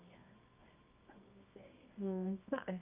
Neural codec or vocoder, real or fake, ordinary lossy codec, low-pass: codec, 24 kHz, 0.9 kbps, WavTokenizer, medium music audio release; fake; none; 3.6 kHz